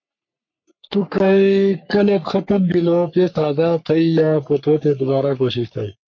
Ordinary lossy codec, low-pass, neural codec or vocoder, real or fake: MP3, 48 kbps; 7.2 kHz; codec, 44.1 kHz, 3.4 kbps, Pupu-Codec; fake